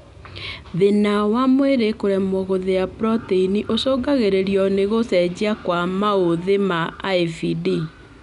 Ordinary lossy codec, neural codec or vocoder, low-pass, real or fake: none; none; 10.8 kHz; real